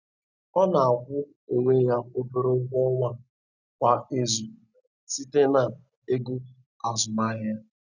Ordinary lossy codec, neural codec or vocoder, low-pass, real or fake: none; none; 7.2 kHz; real